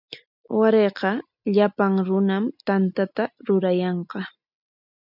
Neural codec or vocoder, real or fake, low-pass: none; real; 5.4 kHz